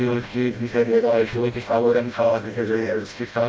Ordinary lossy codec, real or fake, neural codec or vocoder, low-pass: none; fake; codec, 16 kHz, 0.5 kbps, FreqCodec, smaller model; none